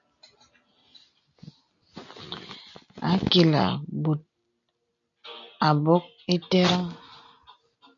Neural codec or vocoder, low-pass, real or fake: none; 7.2 kHz; real